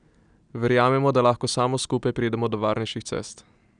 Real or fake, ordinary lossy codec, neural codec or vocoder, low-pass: real; none; none; 9.9 kHz